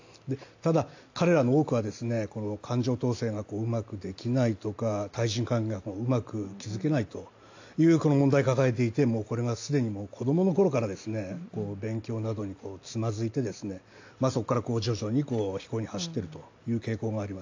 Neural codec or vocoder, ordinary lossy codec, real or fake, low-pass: none; AAC, 48 kbps; real; 7.2 kHz